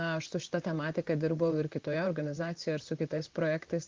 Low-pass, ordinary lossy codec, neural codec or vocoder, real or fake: 7.2 kHz; Opus, 32 kbps; vocoder, 44.1 kHz, 128 mel bands, Pupu-Vocoder; fake